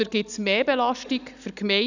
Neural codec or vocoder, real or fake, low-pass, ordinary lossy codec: none; real; 7.2 kHz; none